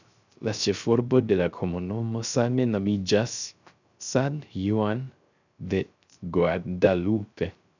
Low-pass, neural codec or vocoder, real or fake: 7.2 kHz; codec, 16 kHz, 0.3 kbps, FocalCodec; fake